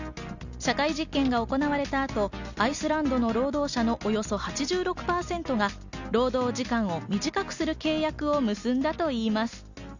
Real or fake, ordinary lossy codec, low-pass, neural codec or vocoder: real; none; 7.2 kHz; none